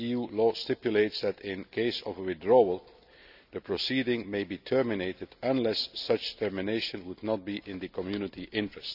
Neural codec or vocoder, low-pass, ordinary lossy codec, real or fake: none; 5.4 kHz; none; real